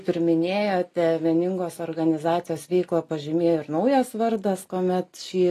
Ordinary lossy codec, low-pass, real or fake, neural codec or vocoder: AAC, 48 kbps; 14.4 kHz; real; none